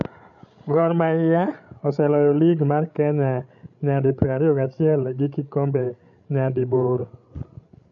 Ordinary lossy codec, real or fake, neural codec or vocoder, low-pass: none; fake; codec, 16 kHz, 16 kbps, FreqCodec, larger model; 7.2 kHz